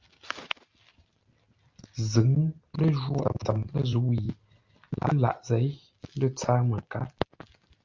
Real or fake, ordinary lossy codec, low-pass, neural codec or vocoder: real; Opus, 24 kbps; 7.2 kHz; none